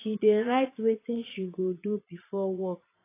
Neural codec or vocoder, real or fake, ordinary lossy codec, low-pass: vocoder, 22.05 kHz, 80 mel bands, Vocos; fake; AAC, 24 kbps; 3.6 kHz